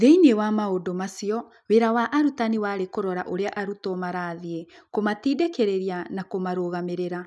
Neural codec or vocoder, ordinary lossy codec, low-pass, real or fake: none; none; none; real